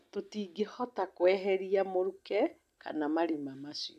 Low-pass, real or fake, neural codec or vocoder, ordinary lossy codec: 14.4 kHz; real; none; AAC, 96 kbps